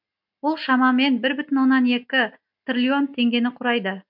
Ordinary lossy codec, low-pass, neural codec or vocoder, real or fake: MP3, 48 kbps; 5.4 kHz; none; real